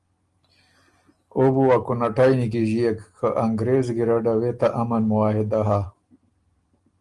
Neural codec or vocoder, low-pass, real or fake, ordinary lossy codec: none; 10.8 kHz; real; Opus, 24 kbps